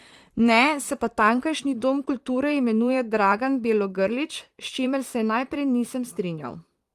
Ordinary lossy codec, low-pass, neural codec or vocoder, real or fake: Opus, 32 kbps; 14.4 kHz; vocoder, 44.1 kHz, 128 mel bands, Pupu-Vocoder; fake